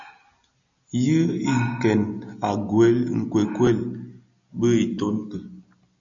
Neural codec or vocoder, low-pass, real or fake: none; 7.2 kHz; real